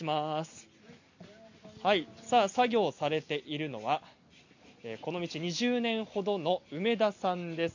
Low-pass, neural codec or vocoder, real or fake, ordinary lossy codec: 7.2 kHz; none; real; none